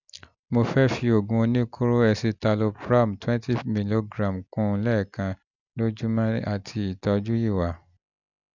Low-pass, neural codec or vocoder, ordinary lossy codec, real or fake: 7.2 kHz; none; none; real